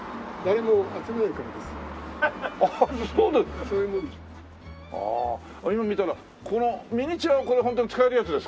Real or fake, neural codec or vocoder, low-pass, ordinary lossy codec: real; none; none; none